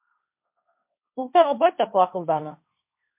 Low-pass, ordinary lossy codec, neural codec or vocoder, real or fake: 3.6 kHz; MP3, 32 kbps; codec, 16 kHz, 1.1 kbps, Voila-Tokenizer; fake